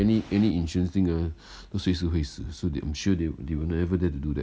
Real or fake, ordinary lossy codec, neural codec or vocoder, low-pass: real; none; none; none